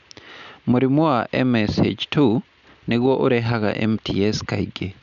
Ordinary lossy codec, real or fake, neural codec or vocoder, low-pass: none; real; none; 7.2 kHz